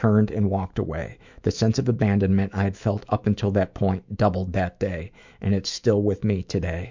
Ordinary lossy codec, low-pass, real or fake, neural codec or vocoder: MP3, 64 kbps; 7.2 kHz; fake; autoencoder, 48 kHz, 128 numbers a frame, DAC-VAE, trained on Japanese speech